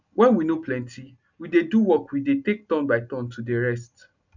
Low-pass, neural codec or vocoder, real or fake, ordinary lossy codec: 7.2 kHz; none; real; none